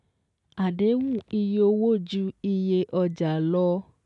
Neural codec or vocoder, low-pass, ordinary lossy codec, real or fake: none; 10.8 kHz; none; real